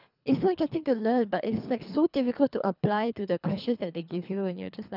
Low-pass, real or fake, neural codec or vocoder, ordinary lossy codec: 5.4 kHz; fake; codec, 24 kHz, 3 kbps, HILCodec; none